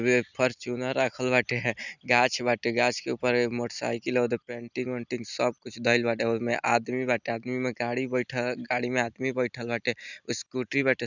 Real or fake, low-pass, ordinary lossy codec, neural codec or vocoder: real; 7.2 kHz; none; none